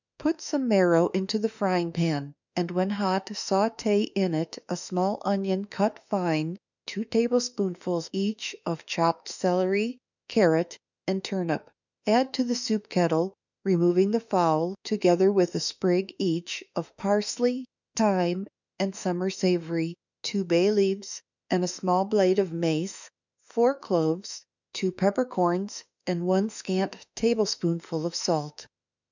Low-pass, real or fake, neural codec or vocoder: 7.2 kHz; fake; autoencoder, 48 kHz, 32 numbers a frame, DAC-VAE, trained on Japanese speech